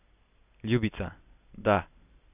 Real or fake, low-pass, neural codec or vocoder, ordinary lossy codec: real; 3.6 kHz; none; none